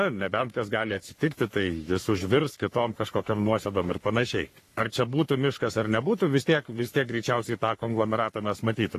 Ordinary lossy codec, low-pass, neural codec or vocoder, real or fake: AAC, 48 kbps; 14.4 kHz; codec, 44.1 kHz, 3.4 kbps, Pupu-Codec; fake